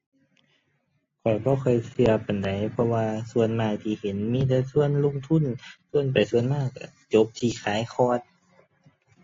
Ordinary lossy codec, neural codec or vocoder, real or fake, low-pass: AAC, 32 kbps; none; real; 7.2 kHz